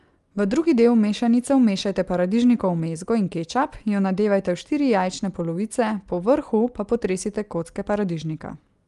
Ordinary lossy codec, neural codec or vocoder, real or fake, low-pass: Opus, 32 kbps; none; real; 10.8 kHz